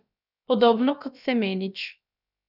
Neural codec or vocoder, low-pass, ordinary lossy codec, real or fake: codec, 16 kHz, about 1 kbps, DyCAST, with the encoder's durations; 5.4 kHz; none; fake